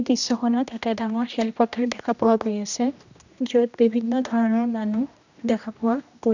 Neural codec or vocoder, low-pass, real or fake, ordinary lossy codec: codec, 16 kHz, 1 kbps, X-Codec, HuBERT features, trained on general audio; 7.2 kHz; fake; none